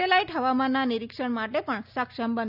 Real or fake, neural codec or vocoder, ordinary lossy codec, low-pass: real; none; none; 5.4 kHz